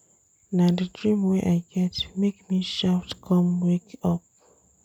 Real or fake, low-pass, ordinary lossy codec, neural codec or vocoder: real; 19.8 kHz; none; none